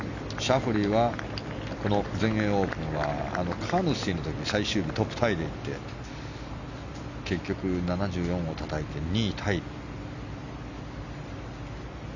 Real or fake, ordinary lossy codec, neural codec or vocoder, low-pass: real; none; none; 7.2 kHz